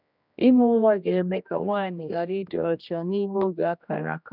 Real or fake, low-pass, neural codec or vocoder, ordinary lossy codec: fake; 5.4 kHz; codec, 16 kHz, 1 kbps, X-Codec, HuBERT features, trained on general audio; none